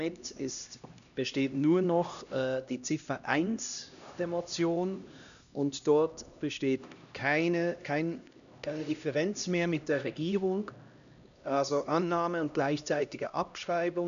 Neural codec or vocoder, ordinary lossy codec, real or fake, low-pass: codec, 16 kHz, 1 kbps, X-Codec, HuBERT features, trained on LibriSpeech; none; fake; 7.2 kHz